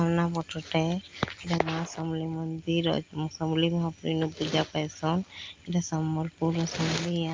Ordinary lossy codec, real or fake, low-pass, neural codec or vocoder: Opus, 32 kbps; real; 7.2 kHz; none